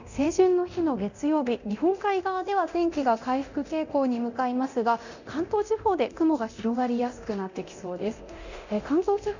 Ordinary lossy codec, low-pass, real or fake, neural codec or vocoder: none; 7.2 kHz; fake; codec, 24 kHz, 0.9 kbps, DualCodec